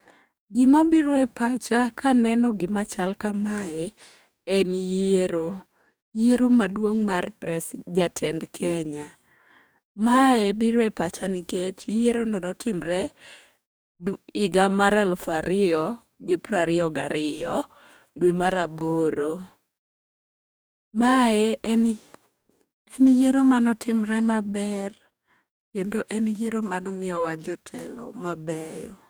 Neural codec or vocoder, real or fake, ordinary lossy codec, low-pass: codec, 44.1 kHz, 2.6 kbps, DAC; fake; none; none